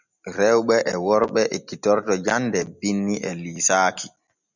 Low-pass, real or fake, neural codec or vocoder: 7.2 kHz; real; none